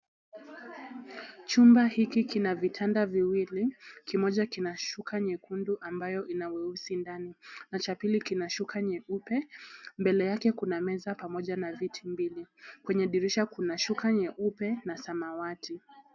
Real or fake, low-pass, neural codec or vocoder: real; 7.2 kHz; none